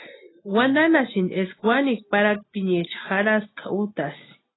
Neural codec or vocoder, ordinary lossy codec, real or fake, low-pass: none; AAC, 16 kbps; real; 7.2 kHz